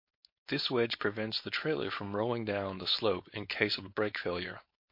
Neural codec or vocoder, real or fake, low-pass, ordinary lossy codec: codec, 16 kHz, 4.8 kbps, FACodec; fake; 5.4 kHz; MP3, 32 kbps